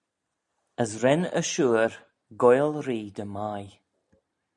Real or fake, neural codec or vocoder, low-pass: real; none; 10.8 kHz